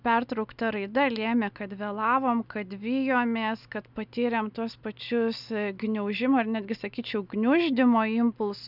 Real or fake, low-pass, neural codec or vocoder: real; 5.4 kHz; none